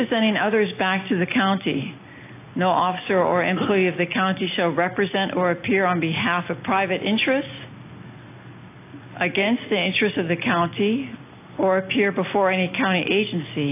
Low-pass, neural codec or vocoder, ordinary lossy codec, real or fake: 3.6 kHz; none; AAC, 24 kbps; real